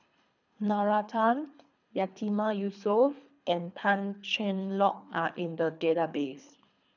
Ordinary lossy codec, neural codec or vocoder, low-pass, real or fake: none; codec, 24 kHz, 3 kbps, HILCodec; 7.2 kHz; fake